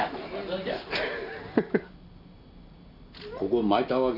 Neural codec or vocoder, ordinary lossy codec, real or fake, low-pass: none; none; real; 5.4 kHz